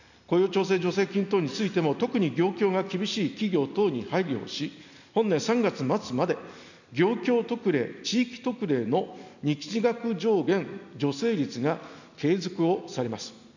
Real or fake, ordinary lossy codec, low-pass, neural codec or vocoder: real; none; 7.2 kHz; none